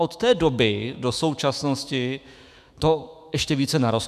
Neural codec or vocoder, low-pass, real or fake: autoencoder, 48 kHz, 128 numbers a frame, DAC-VAE, trained on Japanese speech; 14.4 kHz; fake